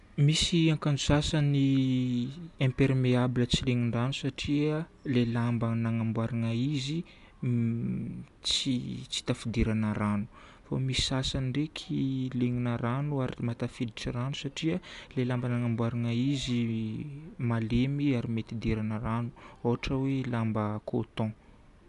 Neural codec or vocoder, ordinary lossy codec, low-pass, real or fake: none; none; 10.8 kHz; real